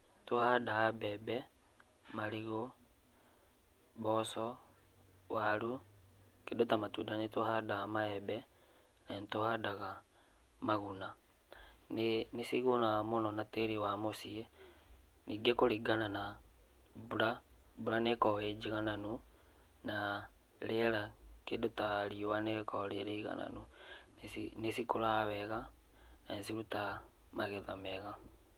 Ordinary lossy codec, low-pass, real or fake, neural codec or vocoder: Opus, 32 kbps; 19.8 kHz; fake; vocoder, 48 kHz, 128 mel bands, Vocos